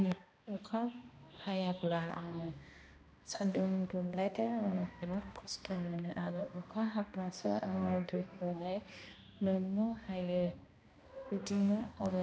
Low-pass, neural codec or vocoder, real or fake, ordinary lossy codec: none; codec, 16 kHz, 1 kbps, X-Codec, HuBERT features, trained on balanced general audio; fake; none